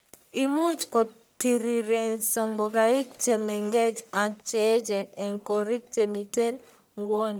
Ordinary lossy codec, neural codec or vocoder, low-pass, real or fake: none; codec, 44.1 kHz, 1.7 kbps, Pupu-Codec; none; fake